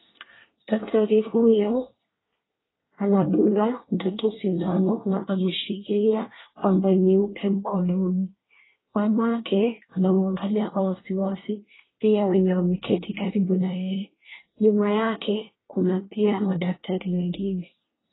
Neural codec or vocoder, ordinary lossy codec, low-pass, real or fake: codec, 24 kHz, 1 kbps, SNAC; AAC, 16 kbps; 7.2 kHz; fake